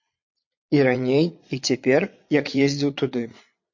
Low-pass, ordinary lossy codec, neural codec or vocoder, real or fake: 7.2 kHz; MP3, 48 kbps; vocoder, 22.05 kHz, 80 mel bands, Vocos; fake